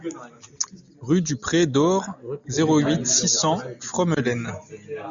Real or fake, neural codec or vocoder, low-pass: real; none; 7.2 kHz